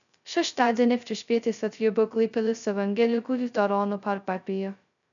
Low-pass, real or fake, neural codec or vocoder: 7.2 kHz; fake; codec, 16 kHz, 0.2 kbps, FocalCodec